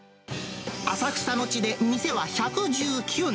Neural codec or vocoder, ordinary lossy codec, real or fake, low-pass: none; none; real; none